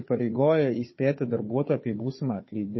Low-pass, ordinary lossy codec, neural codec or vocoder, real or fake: 7.2 kHz; MP3, 24 kbps; codec, 16 kHz, 4 kbps, FunCodec, trained on Chinese and English, 50 frames a second; fake